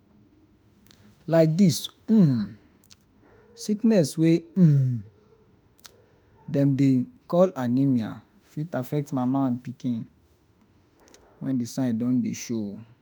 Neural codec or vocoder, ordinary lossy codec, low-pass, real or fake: autoencoder, 48 kHz, 32 numbers a frame, DAC-VAE, trained on Japanese speech; none; none; fake